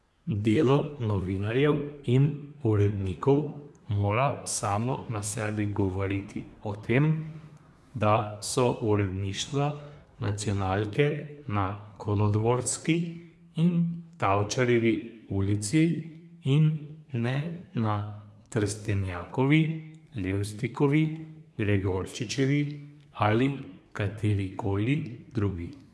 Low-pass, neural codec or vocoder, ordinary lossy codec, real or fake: none; codec, 24 kHz, 1 kbps, SNAC; none; fake